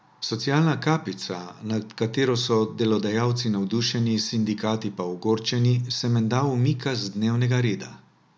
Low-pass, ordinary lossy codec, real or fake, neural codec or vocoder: none; none; real; none